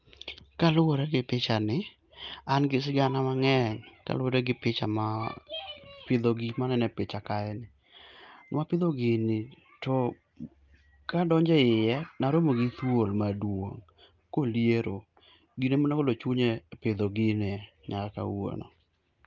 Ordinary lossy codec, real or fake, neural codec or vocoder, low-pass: Opus, 24 kbps; real; none; 7.2 kHz